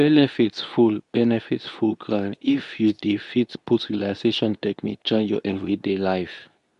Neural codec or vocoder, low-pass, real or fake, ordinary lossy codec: codec, 24 kHz, 0.9 kbps, WavTokenizer, medium speech release version 2; 10.8 kHz; fake; none